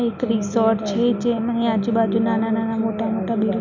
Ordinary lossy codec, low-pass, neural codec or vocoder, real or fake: none; 7.2 kHz; none; real